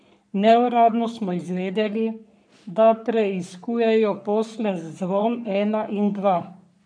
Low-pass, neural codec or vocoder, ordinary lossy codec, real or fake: 9.9 kHz; codec, 44.1 kHz, 3.4 kbps, Pupu-Codec; none; fake